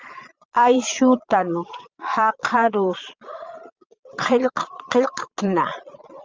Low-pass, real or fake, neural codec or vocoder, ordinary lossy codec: 7.2 kHz; fake; vocoder, 22.05 kHz, 80 mel bands, Vocos; Opus, 32 kbps